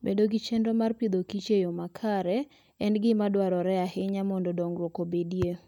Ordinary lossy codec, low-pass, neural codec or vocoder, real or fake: none; 19.8 kHz; none; real